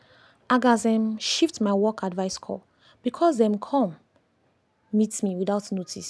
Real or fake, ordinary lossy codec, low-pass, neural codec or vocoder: real; none; none; none